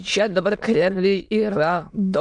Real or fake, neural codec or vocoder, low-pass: fake; autoencoder, 22.05 kHz, a latent of 192 numbers a frame, VITS, trained on many speakers; 9.9 kHz